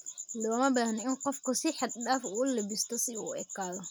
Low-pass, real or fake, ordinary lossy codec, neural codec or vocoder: none; real; none; none